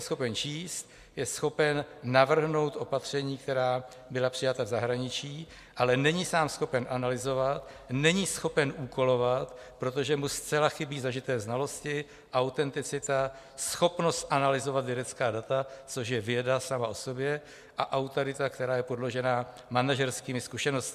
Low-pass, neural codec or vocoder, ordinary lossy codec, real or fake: 14.4 kHz; none; MP3, 96 kbps; real